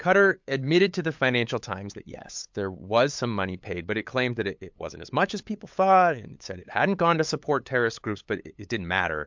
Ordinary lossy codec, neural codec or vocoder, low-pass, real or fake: MP3, 64 kbps; codec, 16 kHz, 8 kbps, FunCodec, trained on LibriTTS, 25 frames a second; 7.2 kHz; fake